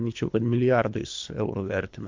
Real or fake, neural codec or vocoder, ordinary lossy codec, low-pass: fake; codec, 24 kHz, 3 kbps, HILCodec; MP3, 48 kbps; 7.2 kHz